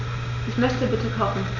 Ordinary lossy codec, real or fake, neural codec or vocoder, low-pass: none; real; none; 7.2 kHz